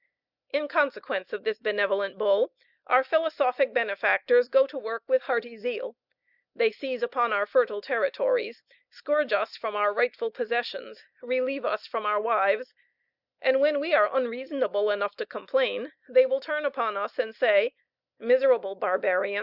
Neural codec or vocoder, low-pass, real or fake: none; 5.4 kHz; real